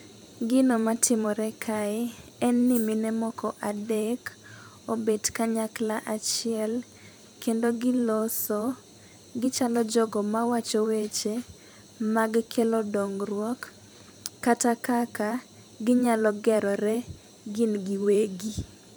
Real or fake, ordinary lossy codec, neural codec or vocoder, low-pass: fake; none; vocoder, 44.1 kHz, 128 mel bands every 512 samples, BigVGAN v2; none